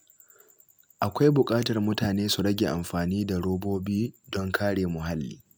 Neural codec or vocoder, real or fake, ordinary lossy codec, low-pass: none; real; none; none